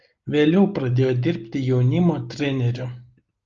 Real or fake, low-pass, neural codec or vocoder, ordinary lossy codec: real; 7.2 kHz; none; Opus, 24 kbps